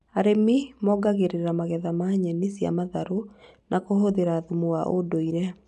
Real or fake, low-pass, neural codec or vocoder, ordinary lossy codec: real; 10.8 kHz; none; none